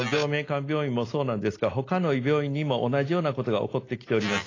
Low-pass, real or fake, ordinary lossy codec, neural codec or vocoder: 7.2 kHz; real; AAC, 32 kbps; none